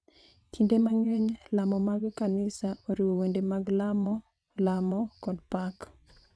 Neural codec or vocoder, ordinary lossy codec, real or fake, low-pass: vocoder, 22.05 kHz, 80 mel bands, WaveNeXt; none; fake; none